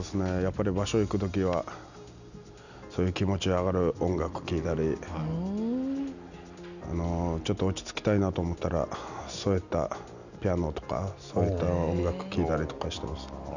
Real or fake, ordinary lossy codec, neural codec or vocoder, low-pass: real; none; none; 7.2 kHz